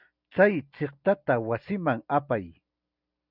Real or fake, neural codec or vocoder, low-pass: real; none; 5.4 kHz